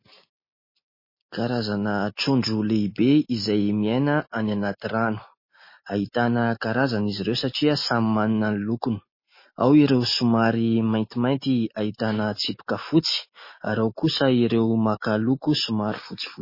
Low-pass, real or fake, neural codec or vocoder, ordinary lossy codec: 5.4 kHz; real; none; MP3, 24 kbps